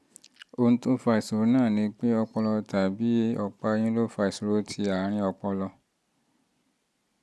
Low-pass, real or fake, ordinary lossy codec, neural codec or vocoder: none; real; none; none